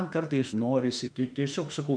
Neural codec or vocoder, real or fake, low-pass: autoencoder, 48 kHz, 32 numbers a frame, DAC-VAE, trained on Japanese speech; fake; 9.9 kHz